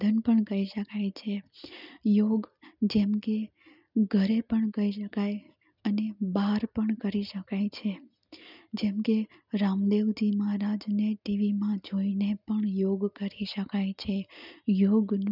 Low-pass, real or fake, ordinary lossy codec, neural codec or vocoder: 5.4 kHz; real; none; none